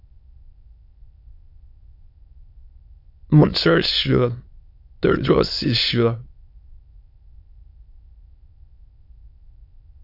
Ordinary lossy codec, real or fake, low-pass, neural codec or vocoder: AAC, 48 kbps; fake; 5.4 kHz; autoencoder, 22.05 kHz, a latent of 192 numbers a frame, VITS, trained on many speakers